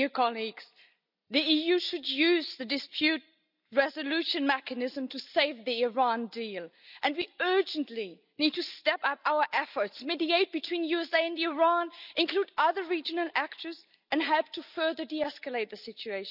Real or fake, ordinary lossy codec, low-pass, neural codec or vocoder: real; none; 5.4 kHz; none